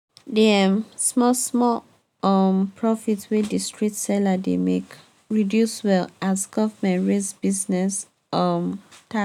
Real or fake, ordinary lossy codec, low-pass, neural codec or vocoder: real; none; 19.8 kHz; none